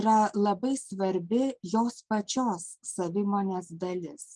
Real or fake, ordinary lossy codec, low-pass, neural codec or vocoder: real; Opus, 32 kbps; 10.8 kHz; none